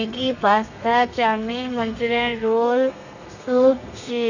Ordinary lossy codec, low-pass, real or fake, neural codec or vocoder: none; 7.2 kHz; fake; codec, 32 kHz, 1.9 kbps, SNAC